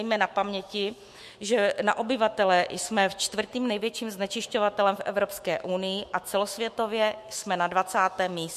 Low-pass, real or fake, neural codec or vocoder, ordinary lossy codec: 14.4 kHz; fake; autoencoder, 48 kHz, 128 numbers a frame, DAC-VAE, trained on Japanese speech; MP3, 64 kbps